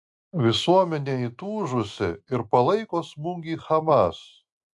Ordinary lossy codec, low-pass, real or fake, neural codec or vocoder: AAC, 64 kbps; 10.8 kHz; real; none